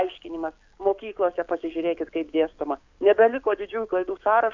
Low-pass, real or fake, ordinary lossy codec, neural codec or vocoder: 7.2 kHz; fake; MP3, 64 kbps; codec, 44.1 kHz, 7.8 kbps, DAC